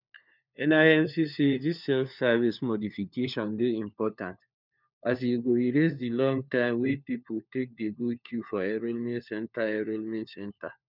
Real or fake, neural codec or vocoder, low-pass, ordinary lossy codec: fake; codec, 16 kHz, 4 kbps, FunCodec, trained on LibriTTS, 50 frames a second; 5.4 kHz; none